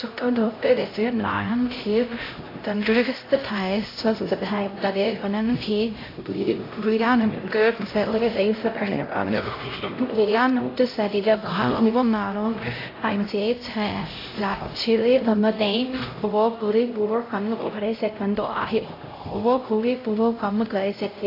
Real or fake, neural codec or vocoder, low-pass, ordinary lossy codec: fake; codec, 16 kHz, 0.5 kbps, X-Codec, HuBERT features, trained on LibriSpeech; 5.4 kHz; AAC, 24 kbps